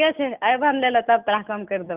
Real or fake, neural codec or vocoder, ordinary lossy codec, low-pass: real; none; Opus, 32 kbps; 3.6 kHz